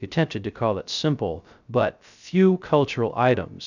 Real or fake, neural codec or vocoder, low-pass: fake; codec, 16 kHz, 0.3 kbps, FocalCodec; 7.2 kHz